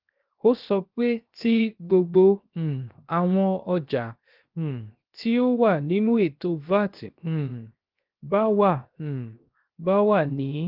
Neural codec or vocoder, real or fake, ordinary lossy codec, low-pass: codec, 16 kHz, 0.7 kbps, FocalCodec; fake; Opus, 32 kbps; 5.4 kHz